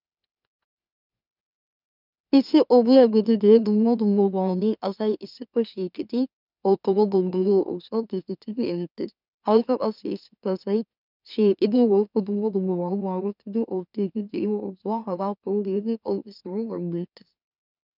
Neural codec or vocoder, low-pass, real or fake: autoencoder, 44.1 kHz, a latent of 192 numbers a frame, MeloTTS; 5.4 kHz; fake